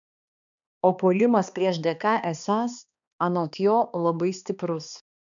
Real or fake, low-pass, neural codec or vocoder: fake; 7.2 kHz; codec, 16 kHz, 2 kbps, X-Codec, HuBERT features, trained on balanced general audio